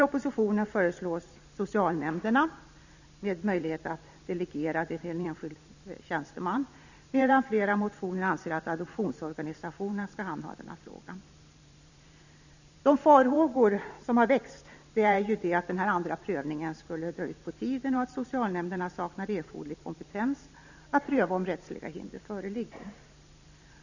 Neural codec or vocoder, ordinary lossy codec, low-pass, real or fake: vocoder, 44.1 kHz, 128 mel bands every 512 samples, BigVGAN v2; none; 7.2 kHz; fake